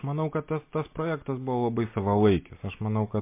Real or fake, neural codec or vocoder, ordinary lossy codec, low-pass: real; none; MP3, 24 kbps; 3.6 kHz